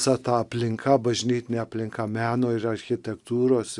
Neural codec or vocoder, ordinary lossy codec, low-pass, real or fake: none; Opus, 64 kbps; 10.8 kHz; real